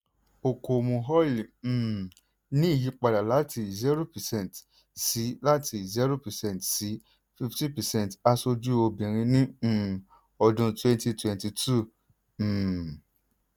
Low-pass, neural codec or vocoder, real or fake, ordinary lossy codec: none; none; real; none